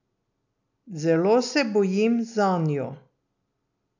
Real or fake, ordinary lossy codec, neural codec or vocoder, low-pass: real; none; none; 7.2 kHz